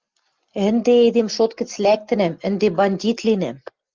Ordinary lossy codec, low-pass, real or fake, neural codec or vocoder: Opus, 24 kbps; 7.2 kHz; real; none